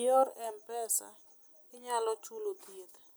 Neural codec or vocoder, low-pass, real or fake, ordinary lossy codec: none; none; real; none